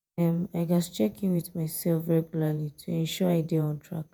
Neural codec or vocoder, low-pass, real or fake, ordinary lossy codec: vocoder, 48 kHz, 128 mel bands, Vocos; none; fake; none